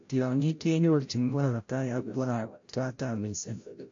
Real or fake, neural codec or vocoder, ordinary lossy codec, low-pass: fake; codec, 16 kHz, 0.5 kbps, FreqCodec, larger model; AAC, 48 kbps; 7.2 kHz